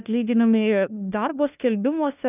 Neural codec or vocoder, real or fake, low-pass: codec, 16 kHz, 1 kbps, FunCodec, trained on LibriTTS, 50 frames a second; fake; 3.6 kHz